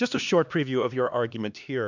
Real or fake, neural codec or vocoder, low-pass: fake; codec, 16 kHz, 2 kbps, X-Codec, HuBERT features, trained on LibriSpeech; 7.2 kHz